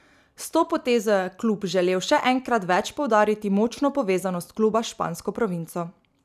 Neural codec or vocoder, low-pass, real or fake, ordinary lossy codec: none; 14.4 kHz; real; none